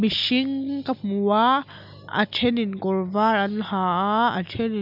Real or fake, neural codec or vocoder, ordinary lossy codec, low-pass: real; none; none; 5.4 kHz